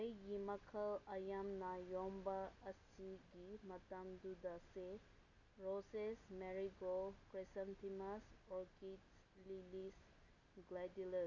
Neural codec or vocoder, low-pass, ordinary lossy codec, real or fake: none; 7.2 kHz; none; real